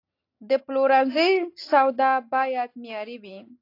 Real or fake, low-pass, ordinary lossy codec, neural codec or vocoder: real; 5.4 kHz; AAC, 32 kbps; none